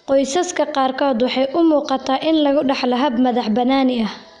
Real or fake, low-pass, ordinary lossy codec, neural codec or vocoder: real; 9.9 kHz; none; none